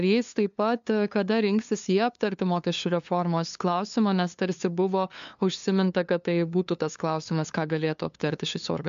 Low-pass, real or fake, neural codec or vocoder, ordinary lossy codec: 7.2 kHz; fake; codec, 16 kHz, 2 kbps, FunCodec, trained on LibriTTS, 25 frames a second; MP3, 64 kbps